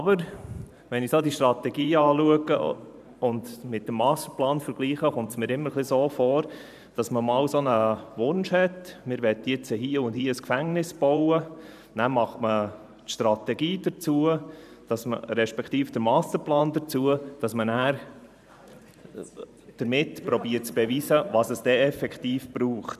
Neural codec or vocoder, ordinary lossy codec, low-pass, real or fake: vocoder, 44.1 kHz, 128 mel bands every 512 samples, BigVGAN v2; none; 14.4 kHz; fake